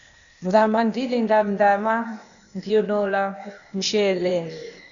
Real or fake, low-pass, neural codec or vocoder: fake; 7.2 kHz; codec, 16 kHz, 0.8 kbps, ZipCodec